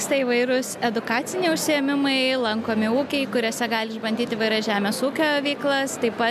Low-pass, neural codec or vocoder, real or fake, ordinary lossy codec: 14.4 kHz; none; real; MP3, 96 kbps